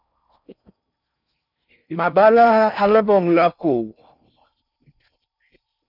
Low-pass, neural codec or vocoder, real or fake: 5.4 kHz; codec, 16 kHz in and 24 kHz out, 0.6 kbps, FocalCodec, streaming, 4096 codes; fake